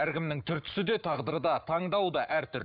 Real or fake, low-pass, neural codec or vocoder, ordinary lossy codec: fake; 5.4 kHz; codec, 44.1 kHz, 7.8 kbps, Pupu-Codec; none